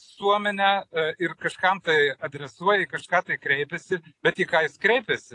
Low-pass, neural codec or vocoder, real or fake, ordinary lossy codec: 10.8 kHz; none; real; AAC, 48 kbps